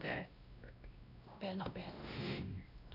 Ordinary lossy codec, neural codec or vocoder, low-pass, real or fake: none; codec, 16 kHz, 1 kbps, X-Codec, WavLM features, trained on Multilingual LibriSpeech; 5.4 kHz; fake